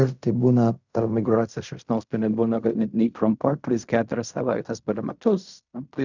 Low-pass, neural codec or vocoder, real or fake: 7.2 kHz; codec, 16 kHz in and 24 kHz out, 0.4 kbps, LongCat-Audio-Codec, fine tuned four codebook decoder; fake